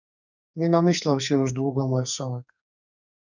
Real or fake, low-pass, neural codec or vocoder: fake; 7.2 kHz; codec, 44.1 kHz, 2.6 kbps, SNAC